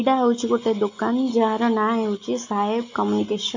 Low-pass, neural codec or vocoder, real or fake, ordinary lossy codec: 7.2 kHz; none; real; AAC, 48 kbps